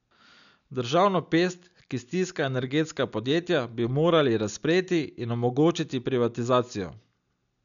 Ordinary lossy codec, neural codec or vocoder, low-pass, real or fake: none; none; 7.2 kHz; real